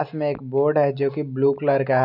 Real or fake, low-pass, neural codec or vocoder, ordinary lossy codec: real; 5.4 kHz; none; none